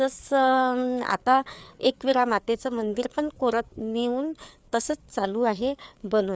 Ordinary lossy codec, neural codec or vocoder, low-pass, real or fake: none; codec, 16 kHz, 4 kbps, FreqCodec, larger model; none; fake